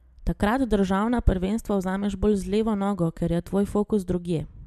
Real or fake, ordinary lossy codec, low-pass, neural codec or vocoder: real; MP3, 96 kbps; 14.4 kHz; none